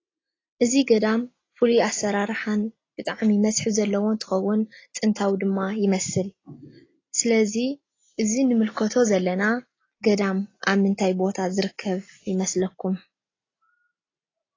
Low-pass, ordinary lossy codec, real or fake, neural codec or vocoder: 7.2 kHz; AAC, 32 kbps; real; none